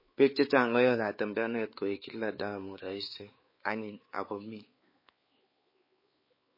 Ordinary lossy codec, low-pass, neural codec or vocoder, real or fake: MP3, 24 kbps; 5.4 kHz; codec, 16 kHz, 4 kbps, X-Codec, WavLM features, trained on Multilingual LibriSpeech; fake